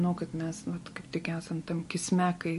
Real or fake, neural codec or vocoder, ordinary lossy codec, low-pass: real; none; MP3, 48 kbps; 14.4 kHz